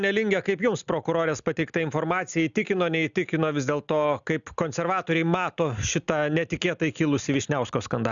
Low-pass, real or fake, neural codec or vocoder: 7.2 kHz; real; none